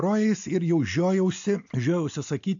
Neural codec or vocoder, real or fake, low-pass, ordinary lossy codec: none; real; 7.2 kHz; AAC, 64 kbps